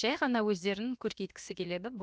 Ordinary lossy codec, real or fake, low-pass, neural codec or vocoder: none; fake; none; codec, 16 kHz, about 1 kbps, DyCAST, with the encoder's durations